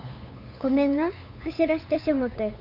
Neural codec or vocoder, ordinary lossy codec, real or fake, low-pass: codec, 16 kHz, 8 kbps, FunCodec, trained on LibriTTS, 25 frames a second; none; fake; 5.4 kHz